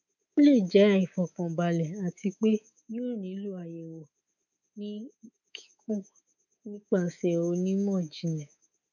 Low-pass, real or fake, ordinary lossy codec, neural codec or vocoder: 7.2 kHz; fake; none; codec, 24 kHz, 3.1 kbps, DualCodec